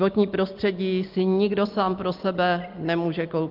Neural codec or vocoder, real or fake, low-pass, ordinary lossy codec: none; real; 5.4 kHz; Opus, 24 kbps